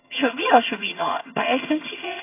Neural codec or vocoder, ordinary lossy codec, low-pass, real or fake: vocoder, 22.05 kHz, 80 mel bands, HiFi-GAN; AAC, 24 kbps; 3.6 kHz; fake